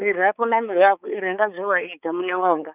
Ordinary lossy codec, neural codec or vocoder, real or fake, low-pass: none; codec, 16 kHz, 4 kbps, X-Codec, HuBERT features, trained on balanced general audio; fake; 3.6 kHz